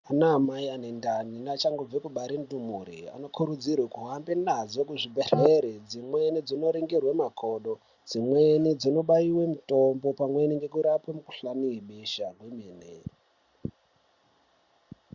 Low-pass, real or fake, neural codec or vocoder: 7.2 kHz; real; none